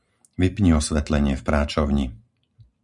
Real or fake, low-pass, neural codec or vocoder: fake; 10.8 kHz; vocoder, 44.1 kHz, 128 mel bands every 512 samples, BigVGAN v2